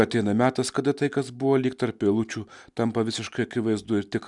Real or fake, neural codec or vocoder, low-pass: real; none; 10.8 kHz